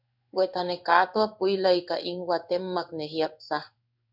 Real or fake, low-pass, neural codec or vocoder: fake; 5.4 kHz; codec, 16 kHz in and 24 kHz out, 1 kbps, XY-Tokenizer